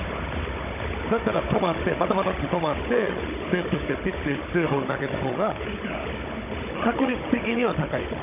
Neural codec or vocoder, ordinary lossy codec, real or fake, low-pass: codec, 16 kHz, 16 kbps, FunCodec, trained on Chinese and English, 50 frames a second; none; fake; 3.6 kHz